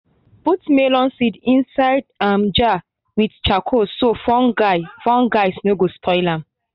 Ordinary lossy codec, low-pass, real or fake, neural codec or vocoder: none; 5.4 kHz; real; none